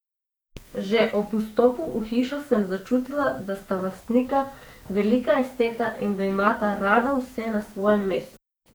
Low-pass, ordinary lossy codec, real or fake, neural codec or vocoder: none; none; fake; codec, 44.1 kHz, 2.6 kbps, SNAC